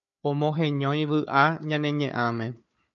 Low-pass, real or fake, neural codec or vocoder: 7.2 kHz; fake; codec, 16 kHz, 4 kbps, FunCodec, trained on Chinese and English, 50 frames a second